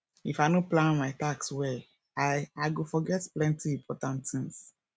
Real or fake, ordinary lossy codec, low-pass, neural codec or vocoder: real; none; none; none